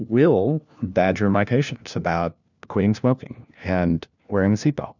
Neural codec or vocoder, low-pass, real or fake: codec, 16 kHz, 1 kbps, FunCodec, trained on LibriTTS, 50 frames a second; 7.2 kHz; fake